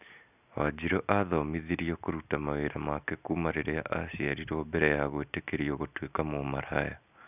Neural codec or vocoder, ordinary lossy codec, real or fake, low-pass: none; none; real; 3.6 kHz